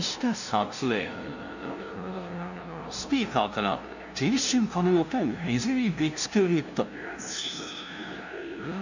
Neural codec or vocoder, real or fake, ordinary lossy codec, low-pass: codec, 16 kHz, 0.5 kbps, FunCodec, trained on LibriTTS, 25 frames a second; fake; none; 7.2 kHz